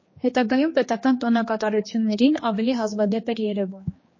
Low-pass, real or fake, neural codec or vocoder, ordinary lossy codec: 7.2 kHz; fake; codec, 16 kHz, 2 kbps, X-Codec, HuBERT features, trained on general audio; MP3, 32 kbps